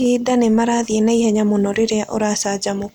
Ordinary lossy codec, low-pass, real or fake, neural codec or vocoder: none; 19.8 kHz; real; none